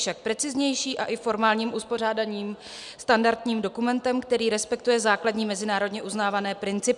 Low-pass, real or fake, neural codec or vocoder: 10.8 kHz; real; none